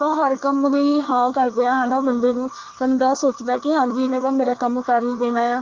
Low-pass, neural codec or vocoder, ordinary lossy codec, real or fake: 7.2 kHz; codec, 24 kHz, 1 kbps, SNAC; Opus, 24 kbps; fake